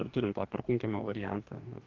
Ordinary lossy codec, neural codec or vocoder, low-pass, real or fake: Opus, 32 kbps; codec, 44.1 kHz, 2.6 kbps, DAC; 7.2 kHz; fake